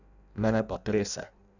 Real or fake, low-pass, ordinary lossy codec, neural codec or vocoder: fake; 7.2 kHz; none; codec, 16 kHz in and 24 kHz out, 0.6 kbps, FireRedTTS-2 codec